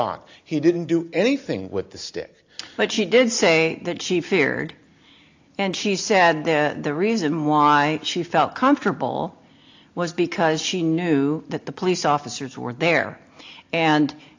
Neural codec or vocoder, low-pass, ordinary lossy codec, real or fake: none; 7.2 kHz; AAC, 48 kbps; real